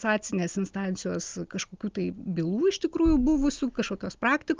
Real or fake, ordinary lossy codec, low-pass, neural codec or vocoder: real; Opus, 32 kbps; 7.2 kHz; none